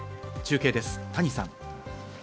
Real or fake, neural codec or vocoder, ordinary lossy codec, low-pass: real; none; none; none